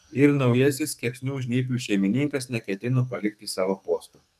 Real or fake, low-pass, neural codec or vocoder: fake; 14.4 kHz; codec, 44.1 kHz, 2.6 kbps, SNAC